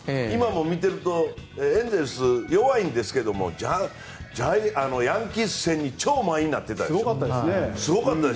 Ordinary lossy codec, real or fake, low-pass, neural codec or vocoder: none; real; none; none